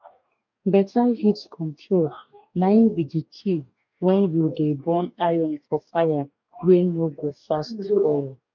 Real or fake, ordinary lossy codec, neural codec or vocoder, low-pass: fake; none; codec, 44.1 kHz, 2.6 kbps, DAC; 7.2 kHz